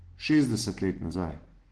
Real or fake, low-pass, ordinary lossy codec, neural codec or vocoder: real; 10.8 kHz; Opus, 16 kbps; none